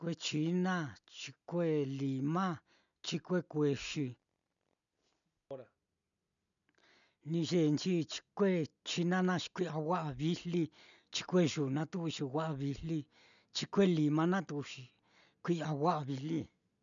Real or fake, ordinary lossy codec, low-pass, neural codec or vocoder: real; none; 7.2 kHz; none